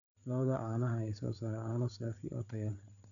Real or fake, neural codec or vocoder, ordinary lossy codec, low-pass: fake; codec, 16 kHz, 8 kbps, FreqCodec, larger model; none; 7.2 kHz